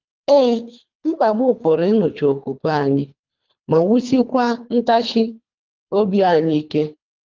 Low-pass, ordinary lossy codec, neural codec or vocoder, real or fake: 7.2 kHz; Opus, 24 kbps; codec, 24 kHz, 3 kbps, HILCodec; fake